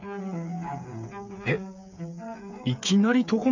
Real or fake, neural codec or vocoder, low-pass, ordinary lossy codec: fake; codec, 16 kHz, 8 kbps, FreqCodec, smaller model; 7.2 kHz; none